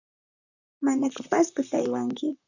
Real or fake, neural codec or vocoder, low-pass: fake; vocoder, 44.1 kHz, 128 mel bands, Pupu-Vocoder; 7.2 kHz